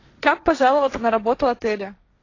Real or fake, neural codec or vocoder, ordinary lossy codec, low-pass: fake; codec, 16 kHz, 1.1 kbps, Voila-Tokenizer; AAC, 32 kbps; 7.2 kHz